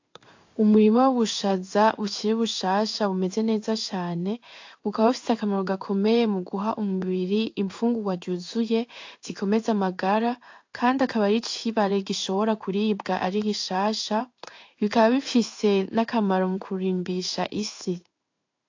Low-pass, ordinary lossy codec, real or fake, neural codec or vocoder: 7.2 kHz; AAC, 48 kbps; fake; codec, 16 kHz in and 24 kHz out, 1 kbps, XY-Tokenizer